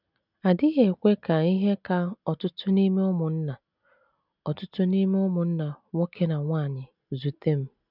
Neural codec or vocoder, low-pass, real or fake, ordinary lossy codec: none; 5.4 kHz; real; none